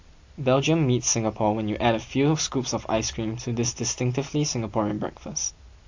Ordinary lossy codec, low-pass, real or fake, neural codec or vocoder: AAC, 48 kbps; 7.2 kHz; real; none